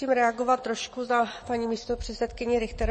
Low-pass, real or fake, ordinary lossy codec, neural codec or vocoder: 10.8 kHz; real; MP3, 32 kbps; none